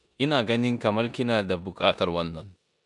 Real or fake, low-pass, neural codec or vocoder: fake; 10.8 kHz; codec, 16 kHz in and 24 kHz out, 0.9 kbps, LongCat-Audio-Codec, four codebook decoder